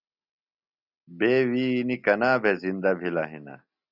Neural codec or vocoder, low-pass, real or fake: none; 5.4 kHz; real